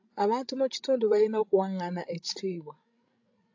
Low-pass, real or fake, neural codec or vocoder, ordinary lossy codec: 7.2 kHz; fake; codec, 16 kHz, 16 kbps, FreqCodec, larger model; AAC, 48 kbps